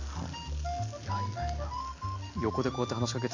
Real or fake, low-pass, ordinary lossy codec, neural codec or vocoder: real; 7.2 kHz; AAC, 48 kbps; none